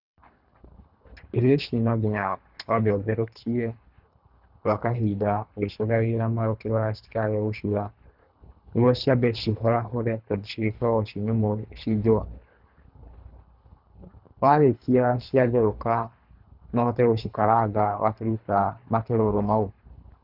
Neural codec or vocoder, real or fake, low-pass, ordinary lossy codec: codec, 24 kHz, 3 kbps, HILCodec; fake; 5.4 kHz; none